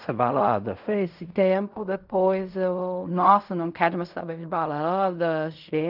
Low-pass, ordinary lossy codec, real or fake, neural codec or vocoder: 5.4 kHz; none; fake; codec, 16 kHz in and 24 kHz out, 0.4 kbps, LongCat-Audio-Codec, fine tuned four codebook decoder